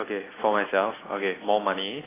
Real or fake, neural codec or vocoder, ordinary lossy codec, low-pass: real; none; AAC, 16 kbps; 3.6 kHz